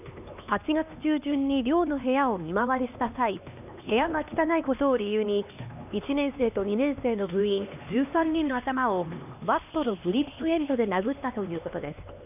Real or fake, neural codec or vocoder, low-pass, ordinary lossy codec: fake; codec, 16 kHz, 2 kbps, X-Codec, HuBERT features, trained on LibriSpeech; 3.6 kHz; none